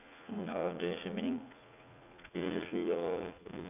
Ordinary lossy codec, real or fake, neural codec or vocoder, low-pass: none; fake; vocoder, 44.1 kHz, 80 mel bands, Vocos; 3.6 kHz